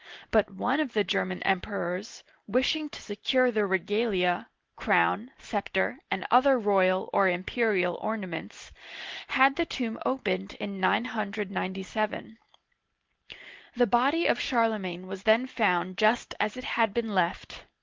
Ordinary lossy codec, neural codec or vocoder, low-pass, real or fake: Opus, 16 kbps; none; 7.2 kHz; real